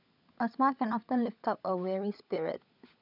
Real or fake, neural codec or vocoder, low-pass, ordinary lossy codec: fake; codec, 16 kHz, 16 kbps, FunCodec, trained on LibriTTS, 50 frames a second; 5.4 kHz; none